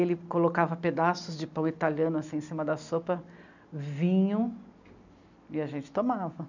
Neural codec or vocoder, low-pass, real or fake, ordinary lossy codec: none; 7.2 kHz; real; none